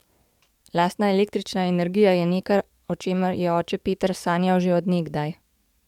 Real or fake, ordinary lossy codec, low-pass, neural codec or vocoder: fake; MP3, 96 kbps; 19.8 kHz; codec, 44.1 kHz, 7.8 kbps, DAC